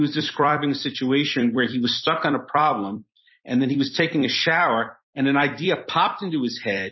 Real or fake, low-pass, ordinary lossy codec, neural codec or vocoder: fake; 7.2 kHz; MP3, 24 kbps; vocoder, 44.1 kHz, 128 mel bands every 256 samples, BigVGAN v2